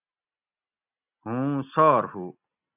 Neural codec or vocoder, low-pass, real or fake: none; 3.6 kHz; real